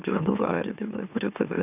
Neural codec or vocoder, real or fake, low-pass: autoencoder, 44.1 kHz, a latent of 192 numbers a frame, MeloTTS; fake; 3.6 kHz